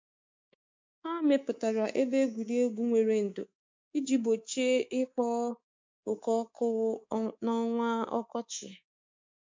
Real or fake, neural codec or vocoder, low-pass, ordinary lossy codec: fake; codec, 24 kHz, 3.1 kbps, DualCodec; 7.2 kHz; MP3, 48 kbps